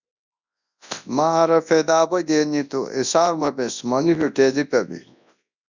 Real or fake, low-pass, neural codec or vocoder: fake; 7.2 kHz; codec, 24 kHz, 0.9 kbps, WavTokenizer, large speech release